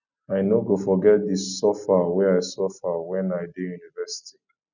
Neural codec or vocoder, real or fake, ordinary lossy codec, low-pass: none; real; none; 7.2 kHz